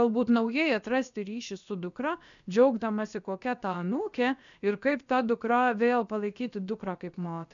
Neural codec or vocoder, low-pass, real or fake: codec, 16 kHz, about 1 kbps, DyCAST, with the encoder's durations; 7.2 kHz; fake